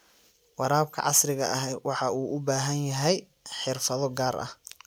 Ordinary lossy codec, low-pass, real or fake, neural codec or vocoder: none; none; real; none